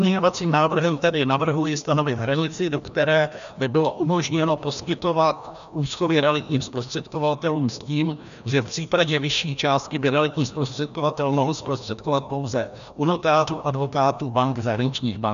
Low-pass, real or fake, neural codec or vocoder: 7.2 kHz; fake; codec, 16 kHz, 1 kbps, FreqCodec, larger model